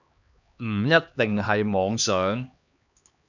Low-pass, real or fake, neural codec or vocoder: 7.2 kHz; fake; codec, 16 kHz, 2 kbps, X-Codec, HuBERT features, trained on LibriSpeech